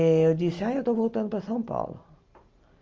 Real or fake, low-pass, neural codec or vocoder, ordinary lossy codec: real; 7.2 kHz; none; Opus, 24 kbps